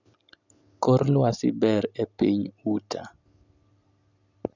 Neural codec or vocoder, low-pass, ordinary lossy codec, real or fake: none; 7.2 kHz; none; real